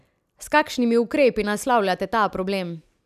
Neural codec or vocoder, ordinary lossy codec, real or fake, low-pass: none; none; real; 14.4 kHz